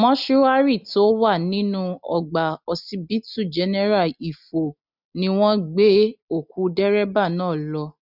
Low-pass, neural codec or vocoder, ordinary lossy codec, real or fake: 5.4 kHz; none; none; real